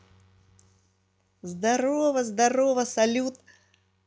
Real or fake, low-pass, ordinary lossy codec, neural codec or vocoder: real; none; none; none